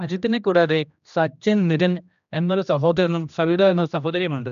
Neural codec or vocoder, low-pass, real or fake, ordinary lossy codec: codec, 16 kHz, 1 kbps, X-Codec, HuBERT features, trained on general audio; 7.2 kHz; fake; none